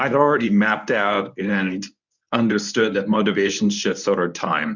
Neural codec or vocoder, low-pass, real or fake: codec, 24 kHz, 0.9 kbps, WavTokenizer, medium speech release version 1; 7.2 kHz; fake